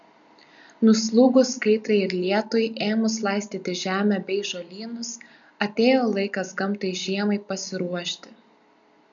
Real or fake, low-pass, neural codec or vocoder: real; 7.2 kHz; none